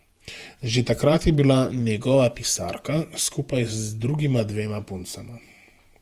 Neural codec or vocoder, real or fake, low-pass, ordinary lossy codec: vocoder, 48 kHz, 128 mel bands, Vocos; fake; 14.4 kHz; Opus, 32 kbps